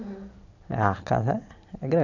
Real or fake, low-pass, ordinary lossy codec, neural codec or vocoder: real; 7.2 kHz; none; none